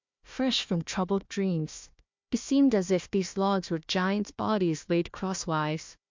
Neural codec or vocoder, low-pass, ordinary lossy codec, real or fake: codec, 16 kHz, 1 kbps, FunCodec, trained on Chinese and English, 50 frames a second; 7.2 kHz; MP3, 64 kbps; fake